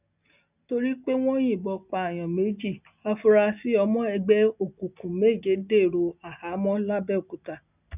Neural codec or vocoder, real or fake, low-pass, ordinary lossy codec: none; real; 3.6 kHz; none